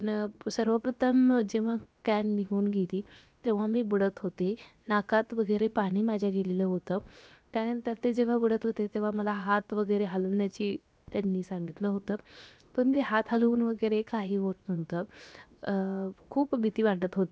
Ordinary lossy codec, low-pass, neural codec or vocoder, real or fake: none; none; codec, 16 kHz, 0.7 kbps, FocalCodec; fake